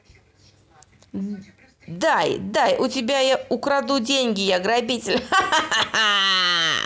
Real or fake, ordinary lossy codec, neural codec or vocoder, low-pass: real; none; none; none